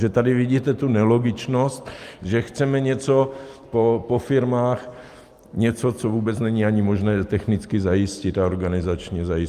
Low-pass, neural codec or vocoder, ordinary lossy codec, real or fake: 14.4 kHz; none; Opus, 32 kbps; real